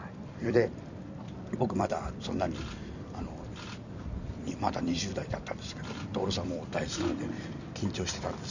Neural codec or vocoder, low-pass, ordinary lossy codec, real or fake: vocoder, 44.1 kHz, 128 mel bands every 256 samples, BigVGAN v2; 7.2 kHz; none; fake